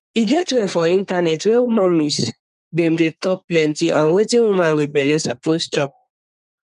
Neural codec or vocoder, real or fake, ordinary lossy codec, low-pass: codec, 24 kHz, 1 kbps, SNAC; fake; none; 10.8 kHz